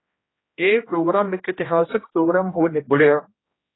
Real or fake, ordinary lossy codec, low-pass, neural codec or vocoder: fake; AAC, 16 kbps; 7.2 kHz; codec, 16 kHz, 1 kbps, X-Codec, HuBERT features, trained on general audio